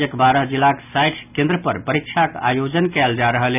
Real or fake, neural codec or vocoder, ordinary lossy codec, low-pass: real; none; none; 3.6 kHz